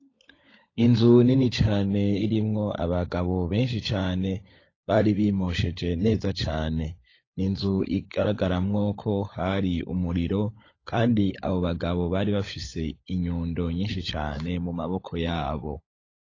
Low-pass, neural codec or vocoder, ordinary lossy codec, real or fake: 7.2 kHz; codec, 16 kHz, 16 kbps, FunCodec, trained on LibriTTS, 50 frames a second; AAC, 32 kbps; fake